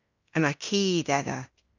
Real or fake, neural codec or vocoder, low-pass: fake; codec, 16 kHz in and 24 kHz out, 0.9 kbps, LongCat-Audio-Codec, fine tuned four codebook decoder; 7.2 kHz